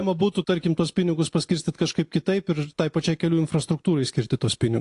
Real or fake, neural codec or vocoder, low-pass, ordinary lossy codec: real; none; 10.8 kHz; AAC, 48 kbps